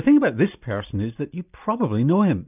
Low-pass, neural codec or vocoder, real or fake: 3.6 kHz; none; real